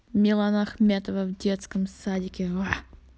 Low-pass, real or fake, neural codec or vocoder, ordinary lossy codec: none; real; none; none